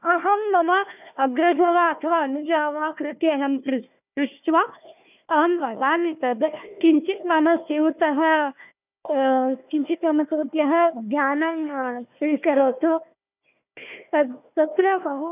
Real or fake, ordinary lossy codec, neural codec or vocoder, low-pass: fake; none; codec, 16 kHz, 1 kbps, FunCodec, trained on Chinese and English, 50 frames a second; 3.6 kHz